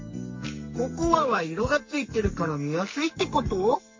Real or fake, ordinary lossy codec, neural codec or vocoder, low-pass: fake; MP3, 32 kbps; codec, 44.1 kHz, 2.6 kbps, SNAC; 7.2 kHz